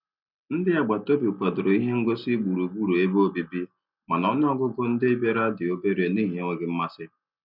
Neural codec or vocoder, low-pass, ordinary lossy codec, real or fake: none; 5.4 kHz; none; real